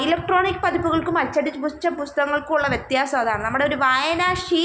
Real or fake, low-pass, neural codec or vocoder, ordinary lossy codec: real; none; none; none